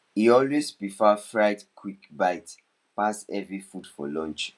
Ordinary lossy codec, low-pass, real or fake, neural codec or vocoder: none; none; real; none